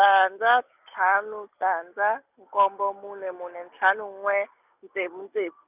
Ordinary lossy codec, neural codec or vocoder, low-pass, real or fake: none; none; 3.6 kHz; real